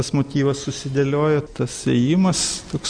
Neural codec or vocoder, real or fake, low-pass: none; real; 9.9 kHz